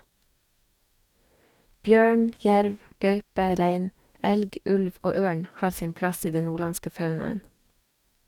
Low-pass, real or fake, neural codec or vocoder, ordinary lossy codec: 19.8 kHz; fake; codec, 44.1 kHz, 2.6 kbps, DAC; none